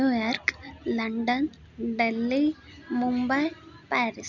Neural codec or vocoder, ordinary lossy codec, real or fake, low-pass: none; none; real; 7.2 kHz